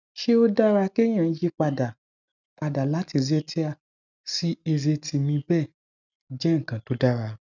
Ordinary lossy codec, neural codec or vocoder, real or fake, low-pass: none; none; real; 7.2 kHz